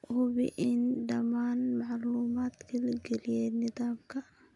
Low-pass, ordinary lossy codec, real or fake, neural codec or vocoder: 10.8 kHz; none; real; none